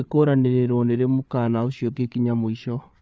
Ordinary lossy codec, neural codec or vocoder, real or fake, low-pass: none; codec, 16 kHz, 8 kbps, FreqCodec, larger model; fake; none